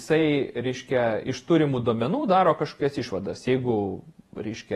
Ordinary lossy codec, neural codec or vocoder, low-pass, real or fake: AAC, 32 kbps; vocoder, 48 kHz, 128 mel bands, Vocos; 19.8 kHz; fake